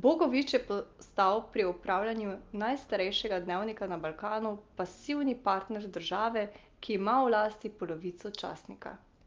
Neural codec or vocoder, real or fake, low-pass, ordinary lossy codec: none; real; 7.2 kHz; Opus, 32 kbps